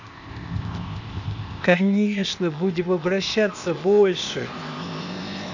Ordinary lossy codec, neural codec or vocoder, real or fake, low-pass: none; codec, 16 kHz, 0.8 kbps, ZipCodec; fake; 7.2 kHz